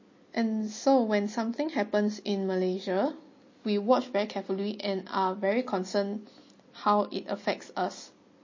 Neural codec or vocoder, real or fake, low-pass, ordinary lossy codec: none; real; 7.2 kHz; MP3, 32 kbps